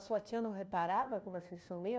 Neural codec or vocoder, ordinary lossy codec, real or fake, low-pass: codec, 16 kHz, 1 kbps, FunCodec, trained on LibriTTS, 50 frames a second; none; fake; none